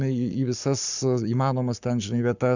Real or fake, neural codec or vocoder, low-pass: fake; codec, 44.1 kHz, 7.8 kbps, Pupu-Codec; 7.2 kHz